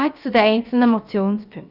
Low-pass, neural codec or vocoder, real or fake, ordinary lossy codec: 5.4 kHz; codec, 16 kHz, about 1 kbps, DyCAST, with the encoder's durations; fake; none